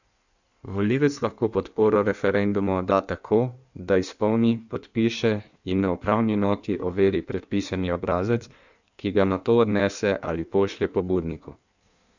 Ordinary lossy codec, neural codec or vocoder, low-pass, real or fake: none; codec, 16 kHz in and 24 kHz out, 1.1 kbps, FireRedTTS-2 codec; 7.2 kHz; fake